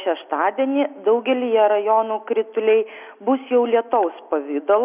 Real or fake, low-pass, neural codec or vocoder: real; 3.6 kHz; none